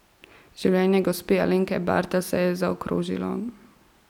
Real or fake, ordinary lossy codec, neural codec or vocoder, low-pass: real; none; none; 19.8 kHz